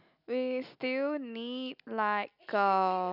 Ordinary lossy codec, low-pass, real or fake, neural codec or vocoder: none; 5.4 kHz; real; none